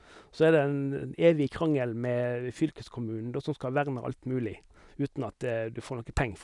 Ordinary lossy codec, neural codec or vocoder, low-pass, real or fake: none; none; 10.8 kHz; real